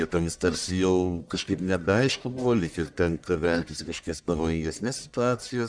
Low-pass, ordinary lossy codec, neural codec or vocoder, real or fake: 9.9 kHz; MP3, 96 kbps; codec, 44.1 kHz, 1.7 kbps, Pupu-Codec; fake